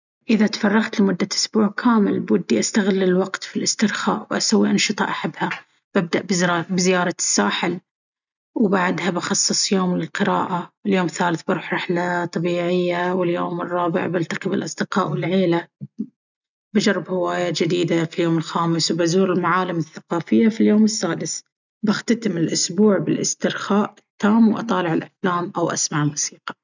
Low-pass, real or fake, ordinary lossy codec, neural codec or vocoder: 7.2 kHz; real; none; none